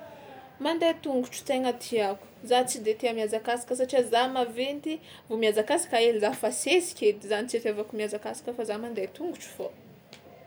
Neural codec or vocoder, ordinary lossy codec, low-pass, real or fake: none; none; none; real